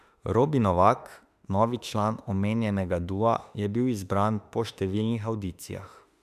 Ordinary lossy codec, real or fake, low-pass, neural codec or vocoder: none; fake; 14.4 kHz; autoencoder, 48 kHz, 32 numbers a frame, DAC-VAE, trained on Japanese speech